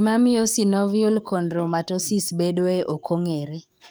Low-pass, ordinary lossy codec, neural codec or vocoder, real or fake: none; none; codec, 44.1 kHz, 7.8 kbps, DAC; fake